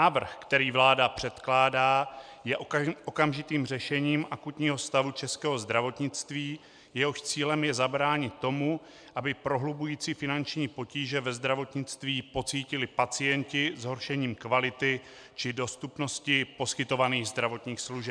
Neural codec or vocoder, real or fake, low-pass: none; real; 9.9 kHz